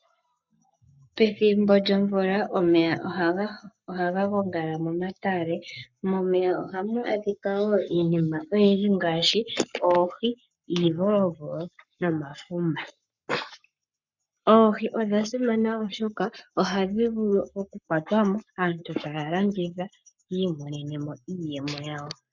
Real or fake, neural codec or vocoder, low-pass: fake; codec, 44.1 kHz, 7.8 kbps, Pupu-Codec; 7.2 kHz